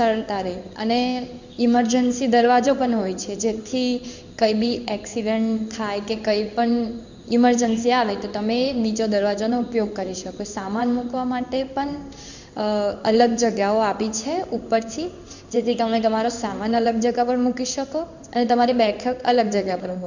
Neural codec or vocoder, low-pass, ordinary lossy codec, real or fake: codec, 16 kHz in and 24 kHz out, 1 kbps, XY-Tokenizer; 7.2 kHz; none; fake